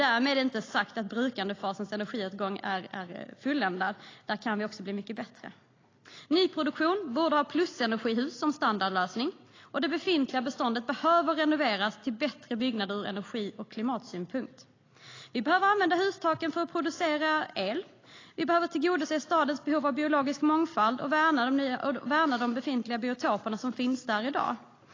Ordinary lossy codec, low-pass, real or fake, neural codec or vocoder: AAC, 32 kbps; 7.2 kHz; real; none